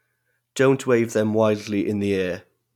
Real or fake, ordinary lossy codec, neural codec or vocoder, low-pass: real; none; none; 19.8 kHz